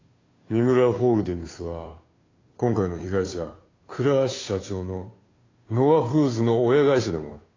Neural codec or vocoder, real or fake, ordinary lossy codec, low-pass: codec, 16 kHz, 2 kbps, FunCodec, trained on Chinese and English, 25 frames a second; fake; AAC, 32 kbps; 7.2 kHz